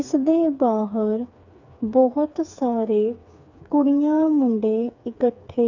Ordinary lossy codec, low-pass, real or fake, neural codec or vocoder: none; 7.2 kHz; fake; codec, 16 kHz, 4 kbps, FreqCodec, smaller model